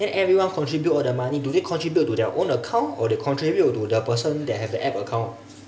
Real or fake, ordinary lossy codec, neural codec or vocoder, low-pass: real; none; none; none